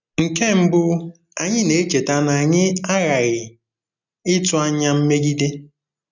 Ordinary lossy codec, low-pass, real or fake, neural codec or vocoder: none; 7.2 kHz; real; none